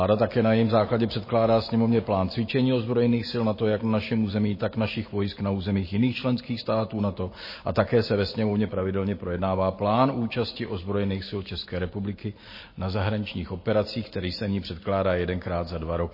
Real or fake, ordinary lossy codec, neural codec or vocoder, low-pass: real; MP3, 24 kbps; none; 5.4 kHz